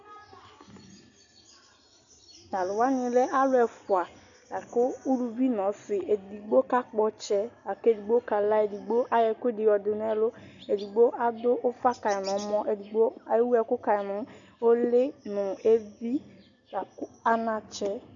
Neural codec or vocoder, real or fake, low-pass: none; real; 7.2 kHz